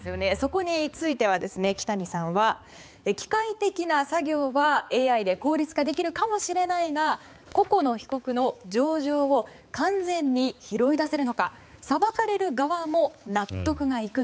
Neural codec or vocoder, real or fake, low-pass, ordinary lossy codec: codec, 16 kHz, 4 kbps, X-Codec, HuBERT features, trained on balanced general audio; fake; none; none